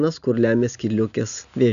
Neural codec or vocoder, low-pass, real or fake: none; 7.2 kHz; real